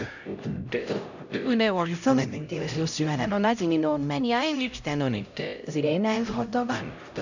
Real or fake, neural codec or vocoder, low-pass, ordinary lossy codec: fake; codec, 16 kHz, 0.5 kbps, X-Codec, HuBERT features, trained on LibriSpeech; 7.2 kHz; none